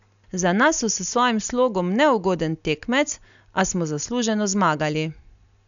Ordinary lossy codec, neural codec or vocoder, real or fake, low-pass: none; none; real; 7.2 kHz